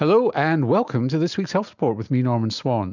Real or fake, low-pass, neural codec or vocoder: real; 7.2 kHz; none